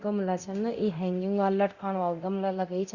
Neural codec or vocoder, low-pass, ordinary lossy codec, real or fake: codec, 24 kHz, 0.9 kbps, DualCodec; 7.2 kHz; Opus, 64 kbps; fake